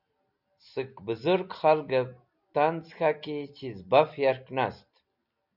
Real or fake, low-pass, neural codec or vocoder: real; 5.4 kHz; none